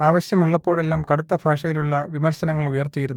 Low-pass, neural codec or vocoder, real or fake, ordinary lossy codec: 19.8 kHz; codec, 44.1 kHz, 2.6 kbps, DAC; fake; none